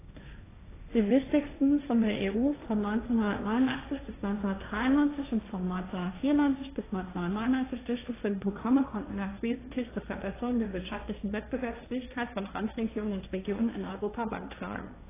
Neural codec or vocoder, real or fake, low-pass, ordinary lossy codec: codec, 16 kHz, 1.1 kbps, Voila-Tokenizer; fake; 3.6 kHz; AAC, 16 kbps